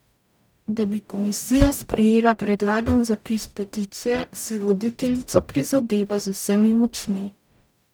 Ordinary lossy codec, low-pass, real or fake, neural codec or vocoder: none; none; fake; codec, 44.1 kHz, 0.9 kbps, DAC